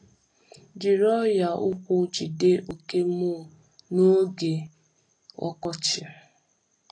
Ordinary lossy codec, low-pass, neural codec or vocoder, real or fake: AAC, 32 kbps; 9.9 kHz; none; real